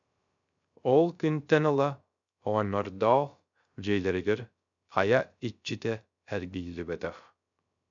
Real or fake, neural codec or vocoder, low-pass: fake; codec, 16 kHz, 0.3 kbps, FocalCodec; 7.2 kHz